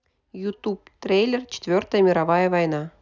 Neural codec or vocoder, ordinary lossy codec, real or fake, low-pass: none; none; real; 7.2 kHz